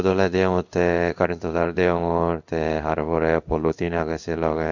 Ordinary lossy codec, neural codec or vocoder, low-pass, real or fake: none; codec, 16 kHz in and 24 kHz out, 1 kbps, XY-Tokenizer; 7.2 kHz; fake